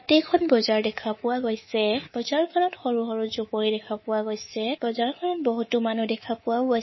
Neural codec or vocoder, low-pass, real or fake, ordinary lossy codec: codec, 24 kHz, 3.1 kbps, DualCodec; 7.2 kHz; fake; MP3, 24 kbps